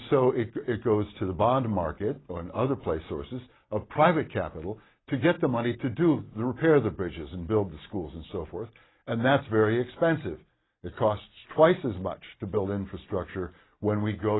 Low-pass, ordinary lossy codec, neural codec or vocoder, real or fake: 7.2 kHz; AAC, 16 kbps; none; real